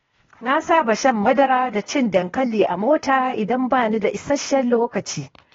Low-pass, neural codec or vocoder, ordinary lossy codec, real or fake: 7.2 kHz; codec, 16 kHz, 0.8 kbps, ZipCodec; AAC, 24 kbps; fake